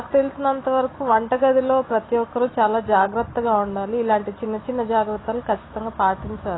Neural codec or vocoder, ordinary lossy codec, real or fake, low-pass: none; AAC, 16 kbps; real; 7.2 kHz